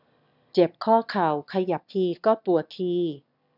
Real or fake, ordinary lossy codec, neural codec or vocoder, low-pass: fake; none; autoencoder, 22.05 kHz, a latent of 192 numbers a frame, VITS, trained on one speaker; 5.4 kHz